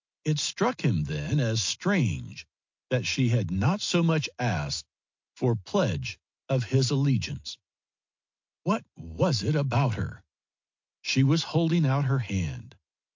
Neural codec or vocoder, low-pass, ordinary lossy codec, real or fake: none; 7.2 kHz; MP3, 48 kbps; real